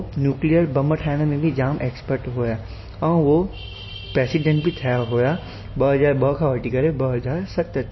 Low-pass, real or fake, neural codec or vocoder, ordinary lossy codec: 7.2 kHz; real; none; MP3, 24 kbps